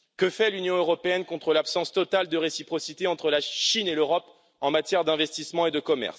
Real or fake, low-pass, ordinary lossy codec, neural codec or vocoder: real; none; none; none